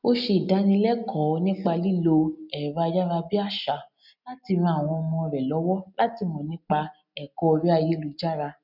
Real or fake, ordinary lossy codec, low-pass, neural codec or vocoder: real; none; 5.4 kHz; none